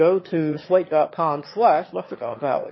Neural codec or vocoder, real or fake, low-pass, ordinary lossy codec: autoencoder, 22.05 kHz, a latent of 192 numbers a frame, VITS, trained on one speaker; fake; 7.2 kHz; MP3, 24 kbps